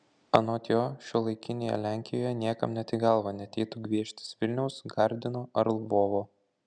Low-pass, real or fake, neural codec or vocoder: 9.9 kHz; real; none